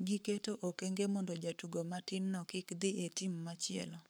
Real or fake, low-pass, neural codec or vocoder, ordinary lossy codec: fake; none; codec, 44.1 kHz, 7.8 kbps, Pupu-Codec; none